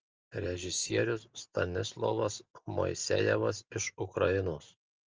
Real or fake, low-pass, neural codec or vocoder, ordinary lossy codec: real; 7.2 kHz; none; Opus, 24 kbps